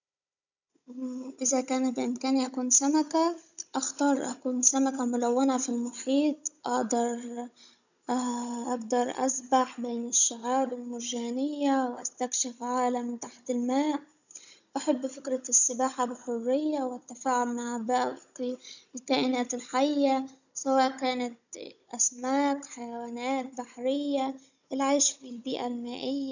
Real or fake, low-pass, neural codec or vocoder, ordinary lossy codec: fake; 7.2 kHz; codec, 16 kHz, 16 kbps, FunCodec, trained on Chinese and English, 50 frames a second; none